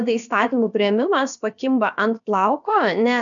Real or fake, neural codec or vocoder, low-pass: fake; codec, 16 kHz, about 1 kbps, DyCAST, with the encoder's durations; 7.2 kHz